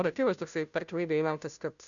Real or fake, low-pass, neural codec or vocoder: fake; 7.2 kHz; codec, 16 kHz, 0.5 kbps, FunCodec, trained on Chinese and English, 25 frames a second